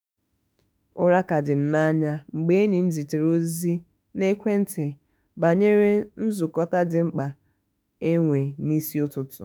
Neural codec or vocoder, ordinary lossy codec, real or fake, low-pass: autoencoder, 48 kHz, 32 numbers a frame, DAC-VAE, trained on Japanese speech; none; fake; none